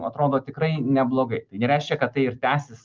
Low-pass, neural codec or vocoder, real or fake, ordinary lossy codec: 7.2 kHz; none; real; Opus, 24 kbps